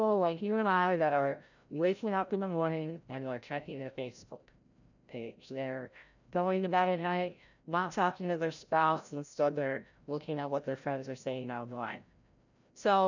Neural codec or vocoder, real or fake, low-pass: codec, 16 kHz, 0.5 kbps, FreqCodec, larger model; fake; 7.2 kHz